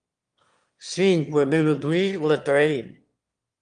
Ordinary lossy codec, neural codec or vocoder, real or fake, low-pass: Opus, 32 kbps; autoencoder, 22.05 kHz, a latent of 192 numbers a frame, VITS, trained on one speaker; fake; 9.9 kHz